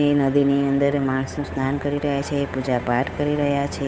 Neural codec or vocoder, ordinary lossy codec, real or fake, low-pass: codec, 16 kHz, 8 kbps, FunCodec, trained on Chinese and English, 25 frames a second; none; fake; none